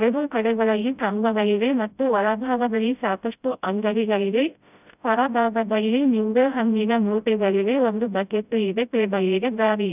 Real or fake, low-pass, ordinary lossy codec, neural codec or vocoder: fake; 3.6 kHz; none; codec, 16 kHz, 0.5 kbps, FreqCodec, smaller model